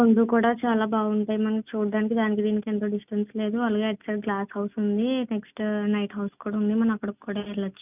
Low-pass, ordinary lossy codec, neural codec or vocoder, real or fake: 3.6 kHz; none; none; real